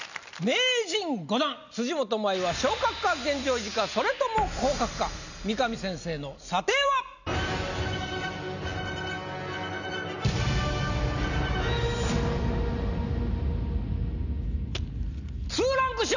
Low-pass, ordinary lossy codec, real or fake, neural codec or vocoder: 7.2 kHz; none; real; none